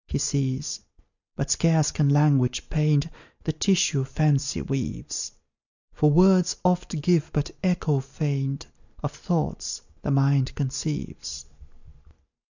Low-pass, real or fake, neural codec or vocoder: 7.2 kHz; real; none